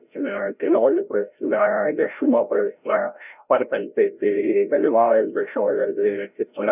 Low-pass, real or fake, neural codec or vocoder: 3.6 kHz; fake; codec, 16 kHz, 0.5 kbps, FreqCodec, larger model